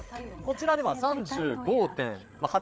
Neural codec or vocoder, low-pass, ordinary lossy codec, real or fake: codec, 16 kHz, 8 kbps, FreqCodec, larger model; none; none; fake